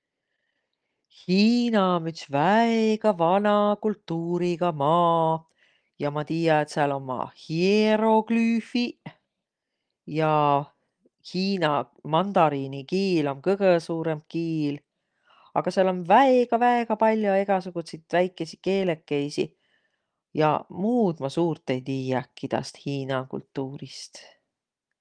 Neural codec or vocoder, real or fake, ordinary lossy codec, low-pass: none; real; Opus, 24 kbps; 9.9 kHz